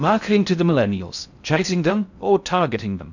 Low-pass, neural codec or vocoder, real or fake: 7.2 kHz; codec, 16 kHz in and 24 kHz out, 0.6 kbps, FocalCodec, streaming, 4096 codes; fake